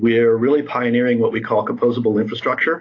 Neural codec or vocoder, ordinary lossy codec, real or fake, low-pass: none; AAC, 32 kbps; real; 7.2 kHz